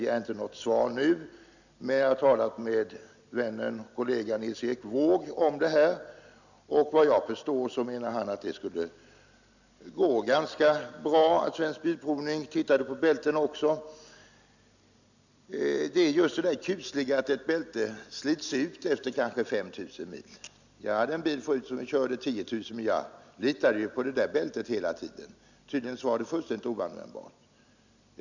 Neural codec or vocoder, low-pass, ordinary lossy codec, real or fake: none; 7.2 kHz; none; real